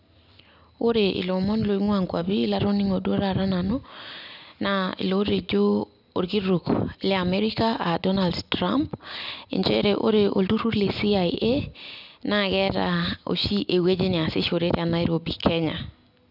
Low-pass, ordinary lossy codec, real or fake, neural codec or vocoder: 5.4 kHz; none; real; none